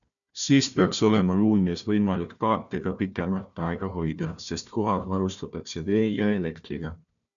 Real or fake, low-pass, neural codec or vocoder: fake; 7.2 kHz; codec, 16 kHz, 1 kbps, FunCodec, trained on Chinese and English, 50 frames a second